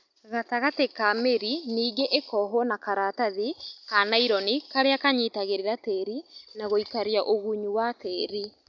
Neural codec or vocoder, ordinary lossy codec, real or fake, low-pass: none; none; real; 7.2 kHz